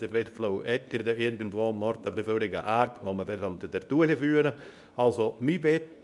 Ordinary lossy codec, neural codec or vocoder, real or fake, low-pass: none; codec, 24 kHz, 0.9 kbps, WavTokenizer, medium speech release version 2; fake; 10.8 kHz